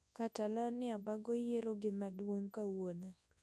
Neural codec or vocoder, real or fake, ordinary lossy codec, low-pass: codec, 24 kHz, 0.9 kbps, WavTokenizer, large speech release; fake; none; none